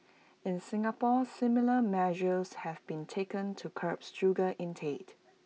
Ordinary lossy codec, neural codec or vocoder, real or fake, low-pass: none; none; real; none